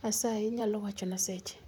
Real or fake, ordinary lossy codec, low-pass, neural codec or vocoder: fake; none; none; vocoder, 44.1 kHz, 128 mel bands, Pupu-Vocoder